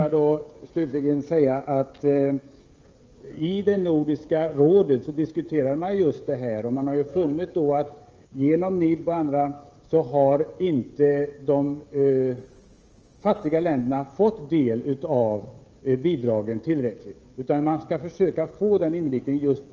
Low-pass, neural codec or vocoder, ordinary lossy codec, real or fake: 7.2 kHz; codec, 16 kHz, 16 kbps, FreqCodec, smaller model; Opus, 24 kbps; fake